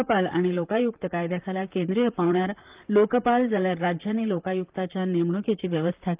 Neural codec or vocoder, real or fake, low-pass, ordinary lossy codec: vocoder, 44.1 kHz, 128 mel bands, Pupu-Vocoder; fake; 3.6 kHz; Opus, 24 kbps